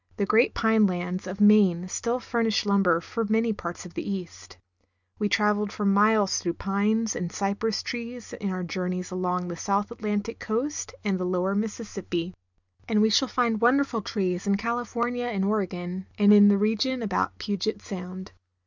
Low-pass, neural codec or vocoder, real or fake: 7.2 kHz; none; real